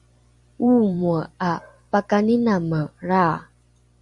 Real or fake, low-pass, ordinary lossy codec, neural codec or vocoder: fake; 10.8 kHz; Opus, 64 kbps; vocoder, 44.1 kHz, 128 mel bands every 256 samples, BigVGAN v2